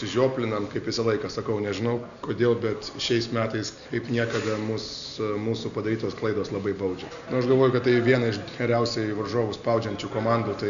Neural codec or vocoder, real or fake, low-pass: none; real; 7.2 kHz